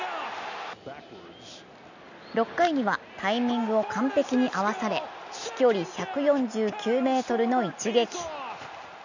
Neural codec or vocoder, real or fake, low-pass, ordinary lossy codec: none; real; 7.2 kHz; none